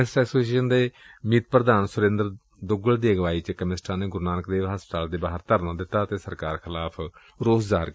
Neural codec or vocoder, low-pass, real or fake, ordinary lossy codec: none; none; real; none